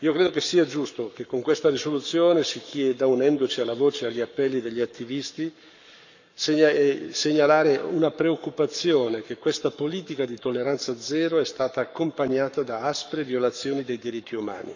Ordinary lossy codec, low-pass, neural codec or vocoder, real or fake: none; 7.2 kHz; codec, 44.1 kHz, 7.8 kbps, Pupu-Codec; fake